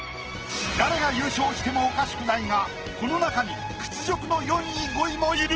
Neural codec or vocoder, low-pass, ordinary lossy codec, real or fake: none; 7.2 kHz; Opus, 16 kbps; real